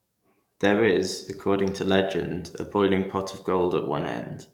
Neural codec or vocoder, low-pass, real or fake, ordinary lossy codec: codec, 44.1 kHz, 7.8 kbps, DAC; 19.8 kHz; fake; none